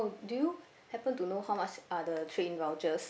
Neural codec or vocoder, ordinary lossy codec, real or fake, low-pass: none; none; real; none